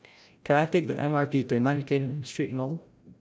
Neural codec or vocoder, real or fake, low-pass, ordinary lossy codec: codec, 16 kHz, 0.5 kbps, FreqCodec, larger model; fake; none; none